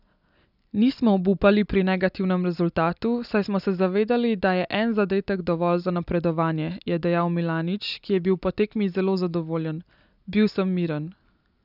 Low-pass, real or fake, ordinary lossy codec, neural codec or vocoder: 5.4 kHz; real; none; none